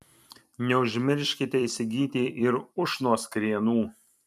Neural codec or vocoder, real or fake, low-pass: vocoder, 44.1 kHz, 128 mel bands every 256 samples, BigVGAN v2; fake; 14.4 kHz